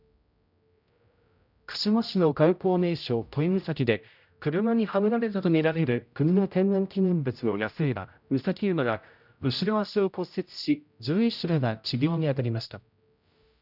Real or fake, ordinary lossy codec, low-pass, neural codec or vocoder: fake; none; 5.4 kHz; codec, 16 kHz, 0.5 kbps, X-Codec, HuBERT features, trained on general audio